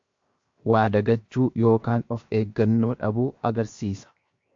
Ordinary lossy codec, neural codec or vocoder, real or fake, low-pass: MP3, 48 kbps; codec, 16 kHz, 0.7 kbps, FocalCodec; fake; 7.2 kHz